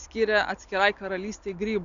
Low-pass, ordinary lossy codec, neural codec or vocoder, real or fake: 7.2 kHz; Opus, 64 kbps; none; real